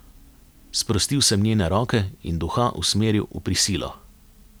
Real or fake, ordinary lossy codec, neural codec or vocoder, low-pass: real; none; none; none